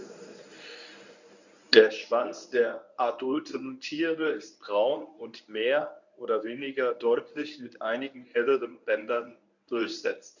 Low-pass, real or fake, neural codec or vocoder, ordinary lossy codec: 7.2 kHz; fake; codec, 24 kHz, 0.9 kbps, WavTokenizer, medium speech release version 1; none